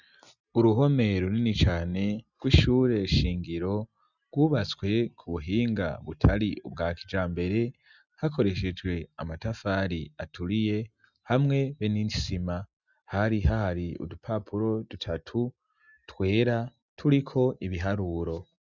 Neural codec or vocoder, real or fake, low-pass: none; real; 7.2 kHz